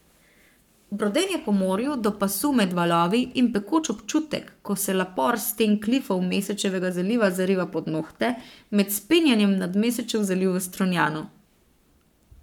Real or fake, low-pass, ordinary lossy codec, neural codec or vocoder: fake; 19.8 kHz; none; codec, 44.1 kHz, 7.8 kbps, Pupu-Codec